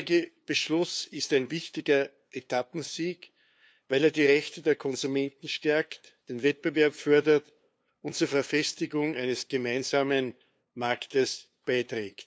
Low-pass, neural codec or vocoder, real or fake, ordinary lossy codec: none; codec, 16 kHz, 2 kbps, FunCodec, trained on LibriTTS, 25 frames a second; fake; none